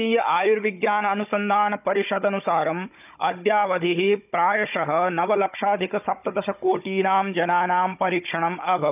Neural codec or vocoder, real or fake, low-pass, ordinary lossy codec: codec, 16 kHz, 16 kbps, FunCodec, trained on Chinese and English, 50 frames a second; fake; 3.6 kHz; none